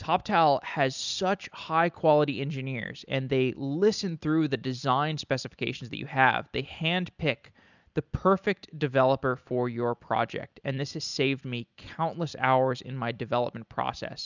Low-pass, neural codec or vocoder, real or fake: 7.2 kHz; none; real